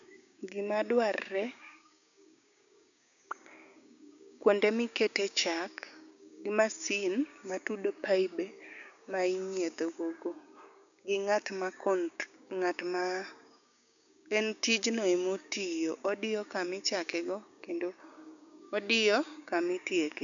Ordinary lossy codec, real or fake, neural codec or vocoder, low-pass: MP3, 96 kbps; fake; codec, 16 kHz, 6 kbps, DAC; 7.2 kHz